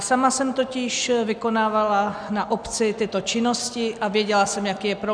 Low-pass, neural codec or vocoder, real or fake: 9.9 kHz; none; real